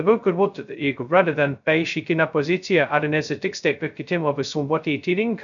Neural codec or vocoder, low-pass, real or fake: codec, 16 kHz, 0.2 kbps, FocalCodec; 7.2 kHz; fake